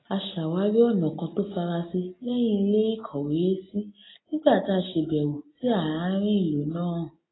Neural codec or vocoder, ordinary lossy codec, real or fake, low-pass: none; AAC, 16 kbps; real; 7.2 kHz